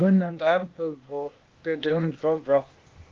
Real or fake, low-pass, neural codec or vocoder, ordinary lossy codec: fake; 7.2 kHz; codec, 16 kHz, 0.8 kbps, ZipCodec; Opus, 32 kbps